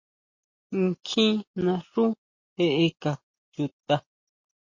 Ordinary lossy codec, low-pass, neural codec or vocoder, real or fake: MP3, 32 kbps; 7.2 kHz; none; real